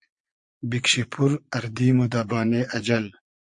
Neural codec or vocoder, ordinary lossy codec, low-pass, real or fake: vocoder, 22.05 kHz, 80 mel bands, Vocos; MP3, 48 kbps; 9.9 kHz; fake